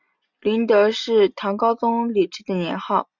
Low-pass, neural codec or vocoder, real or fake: 7.2 kHz; none; real